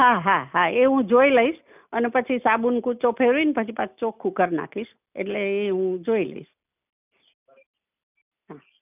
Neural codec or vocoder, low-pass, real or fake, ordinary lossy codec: none; 3.6 kHz; real; none